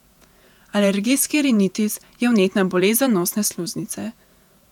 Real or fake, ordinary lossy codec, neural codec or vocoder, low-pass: fake; none; vocoder, 48 kHz, 128 mel bands, Vocos; 19.8 kHz